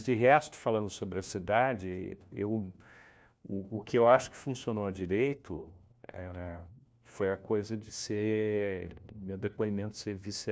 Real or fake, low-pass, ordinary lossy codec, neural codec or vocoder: fake; none; none; codec, 16 kHz, 1 kbps, FunCodec, trained on LibriTTS, 50 frames a second